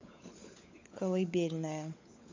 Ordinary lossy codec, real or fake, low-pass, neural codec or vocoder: MP3, 48 kbps; fake; 7.2 kHz; codec, 16 kHz, 16 kbps, FunCodec, trained on LibriTTS, 50 frames a second